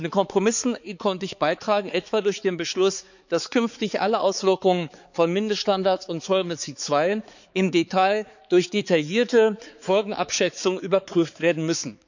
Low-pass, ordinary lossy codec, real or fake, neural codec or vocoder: 7.2 kHz; none; fake; codec, 16 kHz, 4 kbps, X-Codec, HuBERT features, trained on balanced general audio